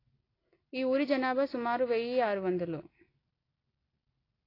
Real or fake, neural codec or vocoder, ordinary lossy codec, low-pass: real; none; AAC, 24 kbps; 5.4 kHz